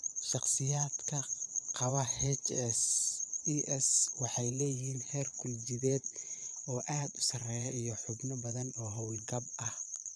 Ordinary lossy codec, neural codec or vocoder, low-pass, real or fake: none; vocoder, 22.05 kHz, 80 mel bands, Vocos; none; fake